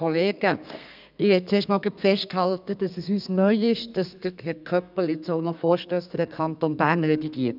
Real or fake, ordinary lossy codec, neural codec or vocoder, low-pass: fake; none; codec, 32 kHz, 1.9 kbps, SNAC; 5.4 kHz